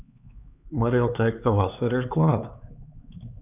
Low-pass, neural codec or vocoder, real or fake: 3.6 kHz; codec, 16 kHz, 4 kbps, X-Codec, HuBERT features, trained on LibriSpeech; fake